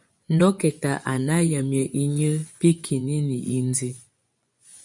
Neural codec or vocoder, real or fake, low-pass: vocoder, 24 kHz, 100 mel bands, Vocos; fake; 10.8 kHz